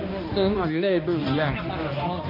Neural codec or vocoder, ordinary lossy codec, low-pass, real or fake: codec, 16 kHz, 1 kbps, X-Codec, HuBERT features, trained on balanced general audio; MP3, 32 kbps; 5.4 kHz; fake